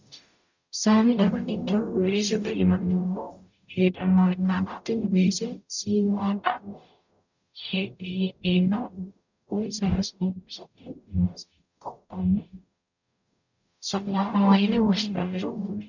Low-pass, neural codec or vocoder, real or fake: 7.2 kHz; codec, 44.1 kHz, 0.9 kbps, DAC; fake